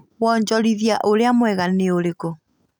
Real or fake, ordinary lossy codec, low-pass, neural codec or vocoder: real; none; 19.8 kHz; none